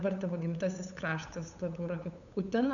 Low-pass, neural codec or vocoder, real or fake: 7.2 kHz; codec, 16 kHz, 8 kbps, FunCodec, trained on LibriTTS, 25 frames a second; fake